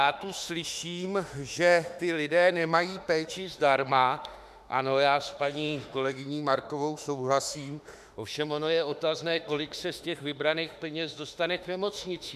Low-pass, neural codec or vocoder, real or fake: 14.4 kHz; autoencoder, 48 kHz, 32 numbers a frame, DAC-VAE, trained on Japanese speech; fake